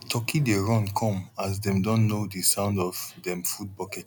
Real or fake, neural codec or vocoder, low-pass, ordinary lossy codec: real; none; 19.8 kHz; none